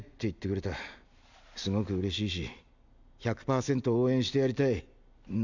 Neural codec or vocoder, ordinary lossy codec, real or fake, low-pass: none; none; real; 7.2 kHz